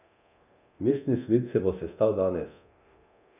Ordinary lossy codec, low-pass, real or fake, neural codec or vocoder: none; 3.6 kHz; fake; codec, 24 kHz, 0.9 kbps, DualCodec